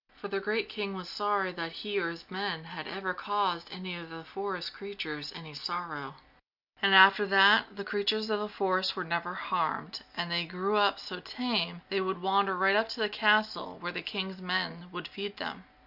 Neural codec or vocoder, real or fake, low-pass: none; real; 5.4 kHz